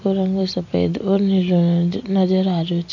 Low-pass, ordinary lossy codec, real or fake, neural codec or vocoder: 7.2 kHz; none; real; none